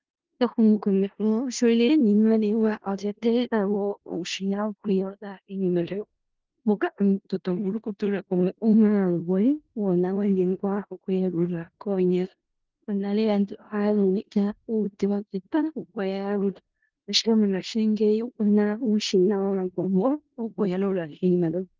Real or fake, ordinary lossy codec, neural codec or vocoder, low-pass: fake; Opus, 16 kbps; codec, 16 kHz in and 24 kHz out, 0.4 kbps, LongCat-Audio-Codec, four codebook decoder; 7.2 kHz